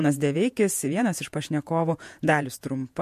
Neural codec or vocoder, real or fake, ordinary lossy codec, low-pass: vocoder, 48 kHz, 128 mel bands, Vocos; fake; MP3, 64 kbps; 14.4 kHz